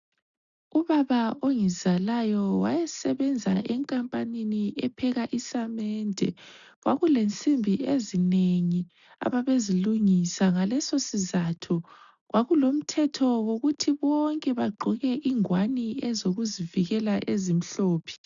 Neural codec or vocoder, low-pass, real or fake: none; 7.2 kHz; real